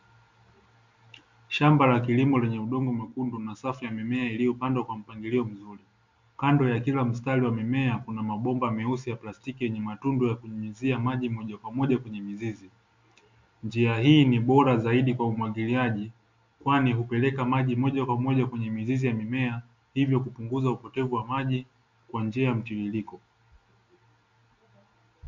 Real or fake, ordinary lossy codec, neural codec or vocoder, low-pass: real; MP3, 64 kbps; none; 7.2 kHz